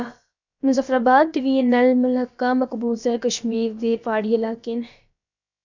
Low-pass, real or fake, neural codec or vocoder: 7.2 kHz; fake; codec, 16 kHz, about 1 kbps, DyCAST, with the encoder's durations